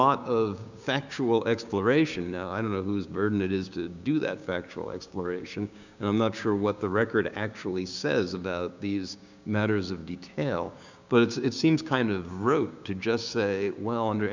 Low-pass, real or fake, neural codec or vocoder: 7.2 kHz; fake; codec, 16 kHz, 6 kbps, DAC